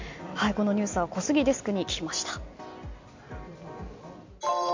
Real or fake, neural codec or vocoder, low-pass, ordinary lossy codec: real; none; 7.2 kHz; MP3, 48 kbps